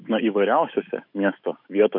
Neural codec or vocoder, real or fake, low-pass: autoencoder, 48 kHz, 128 numbers a frame, DAC-VAE, trained on Japanese speech; fake; 5.4 kHz